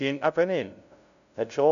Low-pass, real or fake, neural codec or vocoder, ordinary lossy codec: 7.2 kHz; fake; codec, 16 kHz, 0.5 kbps, FunCodec, trained on LibriTTS, 25 frames a second; none